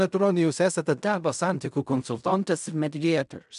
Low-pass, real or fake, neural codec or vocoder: 10.8 kHz; fake; codec, 16 kHz in and 24 kHz out, 0.4 kbps, LongCat-Audio-Codec, fine tuned four codebook decoder